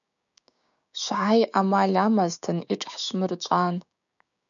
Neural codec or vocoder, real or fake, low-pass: codec, 16 kHz, 6 kbps, DAC; fake; 7.2 kHz